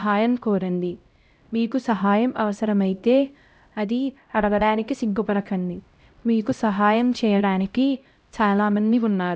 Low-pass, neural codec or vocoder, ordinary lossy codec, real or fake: none; codec, 16 kHz, 0.5 kbps, X-Codec, HuBERT features, trained on LibriSpeech; none; fake